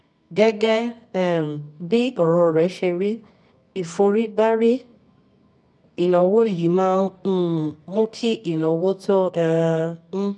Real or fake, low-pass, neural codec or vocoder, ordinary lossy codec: fake; none; codec, 24 kHz, 0.9 kbps, WavTokenizer, medium music audio release; none